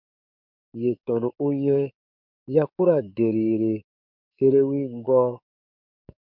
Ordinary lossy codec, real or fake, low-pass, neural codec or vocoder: MP3, 48 kbps; fake; 5.4 kHz; codec, 44.1 kHz, 7.8 kbps, DAC